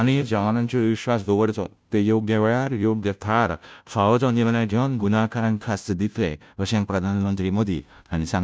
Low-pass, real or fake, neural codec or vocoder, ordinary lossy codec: none; fake; codec, 16 kHz, 0.5 kbps, FunCodec, trained on Chinese and English, 25 frames a second; none